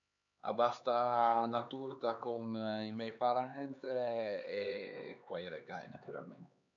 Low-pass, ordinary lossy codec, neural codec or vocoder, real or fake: 7.2 kHz; none; codec, 16 kHz, 4 kbps, X-Codec, HuBERT features, trained on LibriSpeech; fake